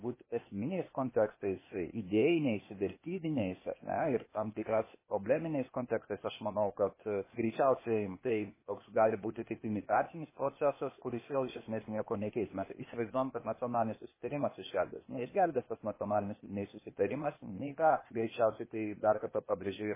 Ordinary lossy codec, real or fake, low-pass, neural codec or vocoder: MP3, 16 kbps; fake; 3.6 kHz; codec, 16 kHz, 0.8 kbps, ZipCodec